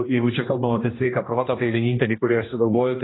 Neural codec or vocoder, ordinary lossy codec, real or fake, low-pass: codec, 16 kHz, 1 kbps, X-Codec, HuBERT features, trained on general audio; AAC, 16 kbps; fake; 7.2 kHz